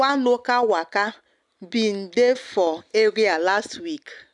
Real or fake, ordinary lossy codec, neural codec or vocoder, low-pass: real; none; none; 10.8 kHz